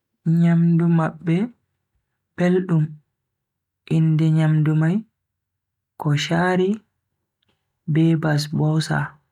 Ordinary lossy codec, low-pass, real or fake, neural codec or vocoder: none; 19.8 kHz; real; none